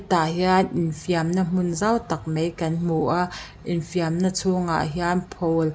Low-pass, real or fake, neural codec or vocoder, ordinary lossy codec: none; real; none; none